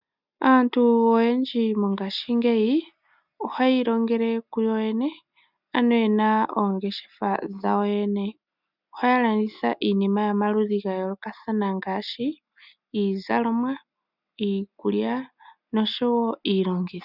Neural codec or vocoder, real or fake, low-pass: none; real; 5.4 kHz